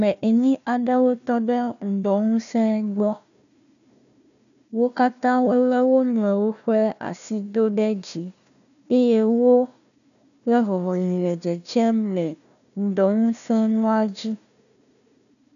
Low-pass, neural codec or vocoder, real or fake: 7.2 kHz; codec, 16 kHz, 1 kbps, FunCodec, trained on Chinese and English, 50 frames a second; fake